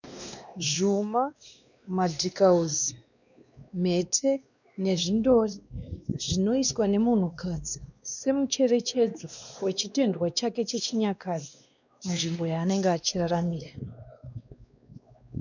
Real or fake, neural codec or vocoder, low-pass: fake; codec, 16 kHz, 2 kbps, X-Codec, WavLM features, trained on Multilingual LibriSpeech; 7.2 kHz